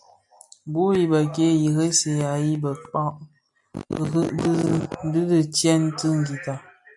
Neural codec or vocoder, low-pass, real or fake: none; 10.8 kHz; real